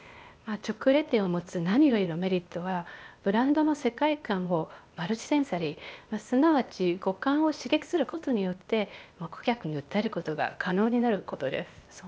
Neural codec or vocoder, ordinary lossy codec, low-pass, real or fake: codec, 16 kHz, 0.8 kbps, ZipCodec; none; none; fake